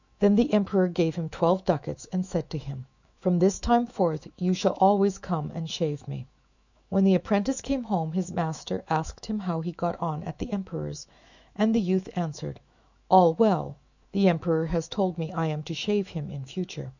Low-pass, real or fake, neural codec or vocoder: 7.2 kHz; fake; autoencoder, 48 kHz, 128 numbers a frame, DAC-VAE, trained on Japanese speech